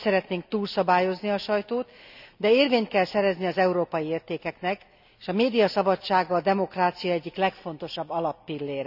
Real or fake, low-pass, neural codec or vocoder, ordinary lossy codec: real; 5.4 kHz; none; none